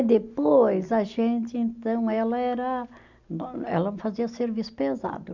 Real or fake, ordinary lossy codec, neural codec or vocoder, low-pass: real; none; none; 7.2 kHz